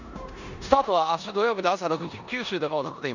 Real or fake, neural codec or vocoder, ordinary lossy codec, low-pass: fake; codec, 16 kHz in and 24 kHz out, 0.9 kbps, LongCat-Audio-Codec, four codebook decoder; none; 7.2 kHz